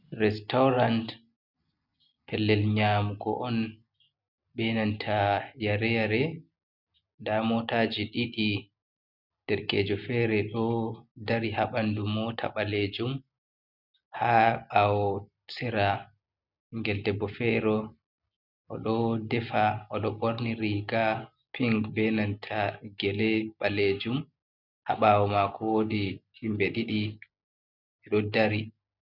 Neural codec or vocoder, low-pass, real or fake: none; 5.4 kHz; real